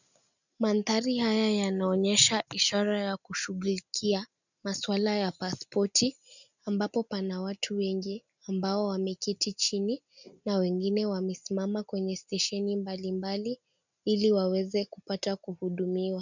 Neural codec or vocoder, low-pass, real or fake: none; 7.2 kHz; real